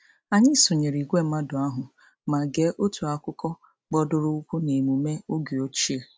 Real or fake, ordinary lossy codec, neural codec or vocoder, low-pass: real; none; none; none